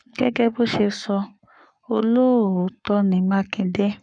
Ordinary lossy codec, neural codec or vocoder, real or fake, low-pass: none; codec, 44.1 kHz, 7.8 kbps, Pupu-Codec; fake; 9.9 kHz